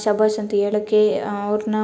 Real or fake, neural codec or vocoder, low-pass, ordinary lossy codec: real; none; none; none